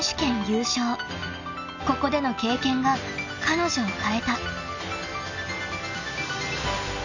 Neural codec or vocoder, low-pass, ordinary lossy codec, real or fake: none; 7.2 kHz; none; real